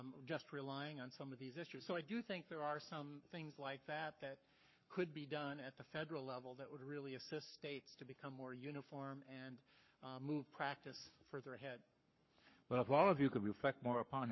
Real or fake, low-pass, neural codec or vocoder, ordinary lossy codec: fake; 7.2 kHz; codec, 44.1 kHz, 7.8 kbps, Pupu-Codec; MP3, 24 kbps